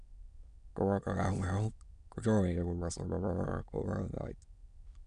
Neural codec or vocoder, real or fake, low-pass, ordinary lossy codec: autoencoder, 22.05 kHz, a latent of 192 numbers a frame, VITS, trained on many speakers; fake; 9.9 kHz; none